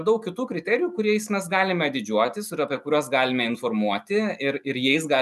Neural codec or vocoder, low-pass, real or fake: none; 14.4 kHz; real